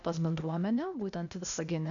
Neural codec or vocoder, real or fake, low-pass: codec, 16 kHz, 0.8 kbps, ZipCodec; fake; 7.2 kHz